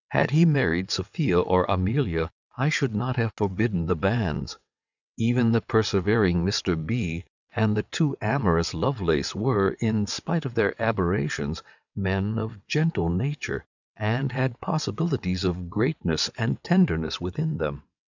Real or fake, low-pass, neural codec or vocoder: fake; 7.2 kHz; vocoder, 22.05 kHz, 80 mel bands, WaveNeXt